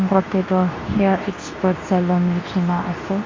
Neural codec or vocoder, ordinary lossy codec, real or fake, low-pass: codec, 24 kHz, 0.9 kbps, WavTokenizer, medium speech release version 2; AAC, 32 kbps; fake; 7.2 kHz